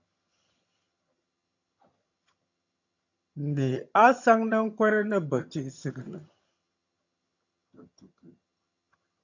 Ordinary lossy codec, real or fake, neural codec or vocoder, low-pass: AAC, 48 kbps; fake; vocoder, 22.05 kHz, 80 mel bands, HiFi-GAN; 7.2 kHz